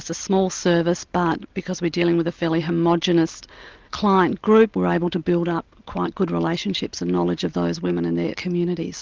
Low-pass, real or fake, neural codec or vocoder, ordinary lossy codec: 7.2 kHz; real; none; Opus, 32 kbps